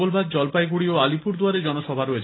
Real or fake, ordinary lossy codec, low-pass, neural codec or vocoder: real; AAC, 16 kbps; 7.2 kHz; none